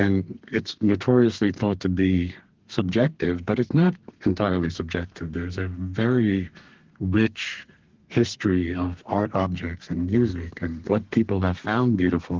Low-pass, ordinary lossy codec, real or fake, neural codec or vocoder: 7.2 kHz; Opus, 16 kbps; fake; codec, 32 kHz, 1.9 kbps, SNAC